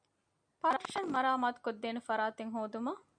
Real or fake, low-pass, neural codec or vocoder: real; 9.9 kHz; none